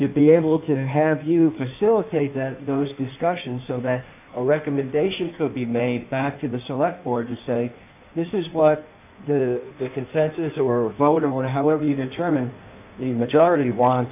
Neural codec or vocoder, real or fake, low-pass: codec, 16 kHz in and 24 kHz out, 1.1 kbps, FireRedTTS-2 codec; fake; 3.6 kHz